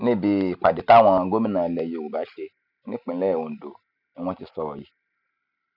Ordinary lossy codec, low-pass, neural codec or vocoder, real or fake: none; 5.4 kHz; none; real